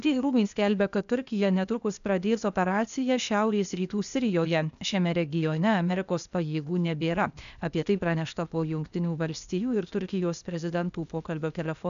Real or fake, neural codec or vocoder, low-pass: fake; codec, 16 kHz, 0.8 kbps, ZipCodec; 7.2 kHz